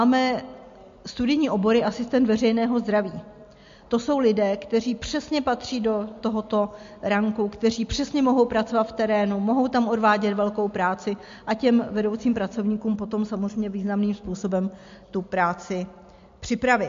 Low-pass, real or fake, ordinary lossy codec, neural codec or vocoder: 7.2 kHz; real; MP3, 48 kbps; none